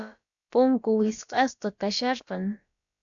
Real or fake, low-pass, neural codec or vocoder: fake; 7.2 kHz; codec, 16 kHz, about 1 kbps, DyCAST, with the encoder's durations